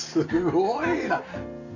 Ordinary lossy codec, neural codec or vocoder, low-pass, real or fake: none; none; 7.2 kHz; real